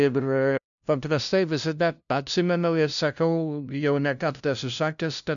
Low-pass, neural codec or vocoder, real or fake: 7.2 kHz; codec, 16 kHz, 0.5 kbps, FunCodec, trained on LibriTTS, 25 frames a second; fake